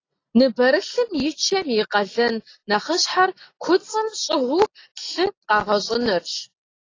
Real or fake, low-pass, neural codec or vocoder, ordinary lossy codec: real; 7.2 kHz; none; AAC, 32 kbps